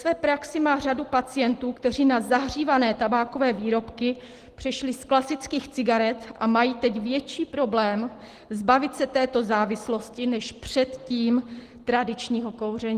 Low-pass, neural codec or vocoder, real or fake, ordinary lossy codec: 14.4 kHz; none; real; Opus, 16 kbps